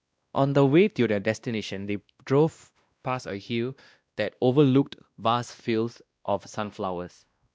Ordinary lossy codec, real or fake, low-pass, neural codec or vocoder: none; fake; none; codec, 16 kHz, 1 kbps, X-Codec, WavLM features, trained on Multilingual LibriSpeech